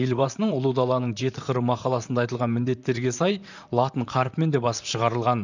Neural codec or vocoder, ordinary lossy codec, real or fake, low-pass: vocoder, 44.1 kHz, 128 mel bands, Pupu-Vocoder; none; fake; 7.2 kHz